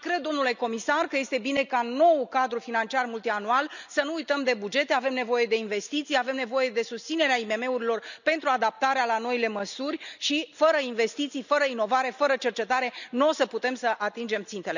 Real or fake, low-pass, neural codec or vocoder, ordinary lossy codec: real; 7.2 kHz; none; none